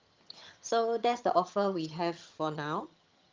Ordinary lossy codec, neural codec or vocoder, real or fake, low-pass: Opus, 24 kbps; vocoder, 22.05 kHz, 80 mel bands, HiFi-GAN; fake; 7.2 kHz